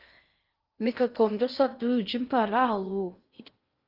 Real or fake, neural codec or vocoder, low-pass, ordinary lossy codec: fake; codec, 16 kHz in and 24 kHz out, 0.6 kbps, FocalCodec, streaming, 2048 codes; 5.4 kHz; Opus, 24 kbps